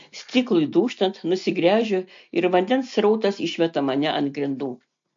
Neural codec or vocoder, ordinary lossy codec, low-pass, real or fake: none; MP3, 48 kbps; 7.2 kHz; real